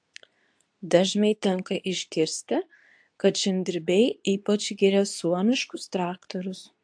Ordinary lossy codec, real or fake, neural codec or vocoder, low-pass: AAC, 64 kbps; fake; codec, 24 kHz, 0.9 kbps, WavTokenizer, medium speech release version 2; 9.9 kHz